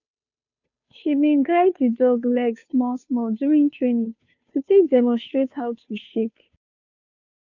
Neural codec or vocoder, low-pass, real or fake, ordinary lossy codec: codec, 16 kHz, 2 kbps, FunCodec, trained on Chinese and English, 25 frames a second; 7.2 kHz; fake; none